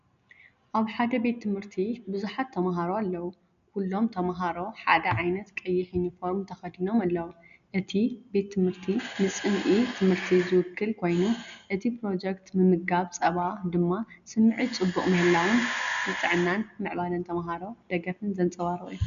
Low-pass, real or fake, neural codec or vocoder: 7.2 kHz; real; none